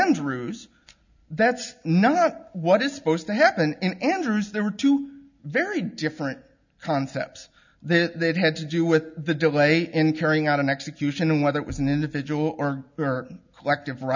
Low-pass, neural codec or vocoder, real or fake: 7.2 kHz; none; real